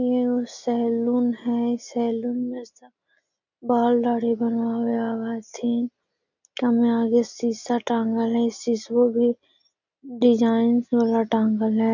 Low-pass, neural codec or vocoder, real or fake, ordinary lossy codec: 7.2 kHz; none; real; none